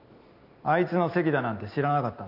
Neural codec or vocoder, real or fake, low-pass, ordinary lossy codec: none; real; 5.4 kHz; none